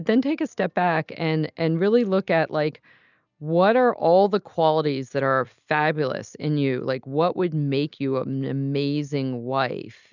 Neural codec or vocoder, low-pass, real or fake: none; 7.2 kHz; real